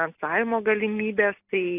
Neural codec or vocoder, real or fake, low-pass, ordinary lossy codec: none; real; 3.6 kHz; AAC, 24 kbps